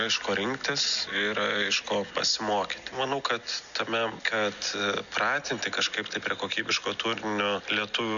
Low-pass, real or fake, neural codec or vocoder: 7.2 kHz; real; none